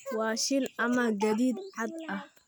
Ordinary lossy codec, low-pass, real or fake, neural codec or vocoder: none; none; real; none